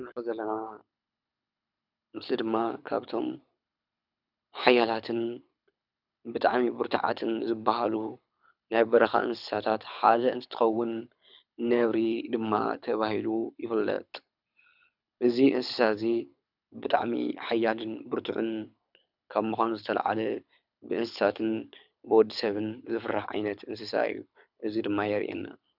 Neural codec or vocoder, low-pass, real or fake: codec, 24 kHz, 6 kbps, HILCodec; 5.4 kHz; fake